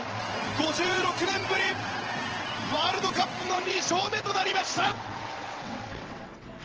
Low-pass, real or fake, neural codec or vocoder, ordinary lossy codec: 7.2 kHz; fake; vocoder, 22.05 kHz, 80 mel bands, WaveNeXt; Opus, 16 kbps